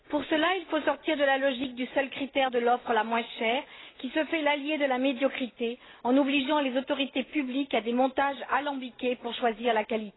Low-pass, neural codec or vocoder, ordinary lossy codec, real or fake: 7.2 kHz; none; AAC, 16 kbps; real